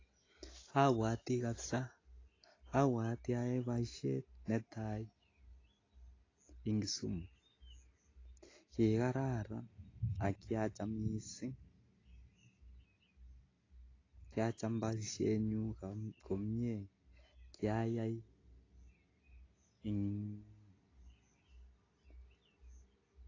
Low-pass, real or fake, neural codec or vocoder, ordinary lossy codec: 7.2 kHz; real; none; AAC, 32 kbps